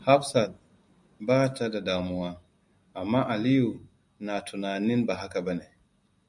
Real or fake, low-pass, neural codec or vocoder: real; 10.8 kHz; none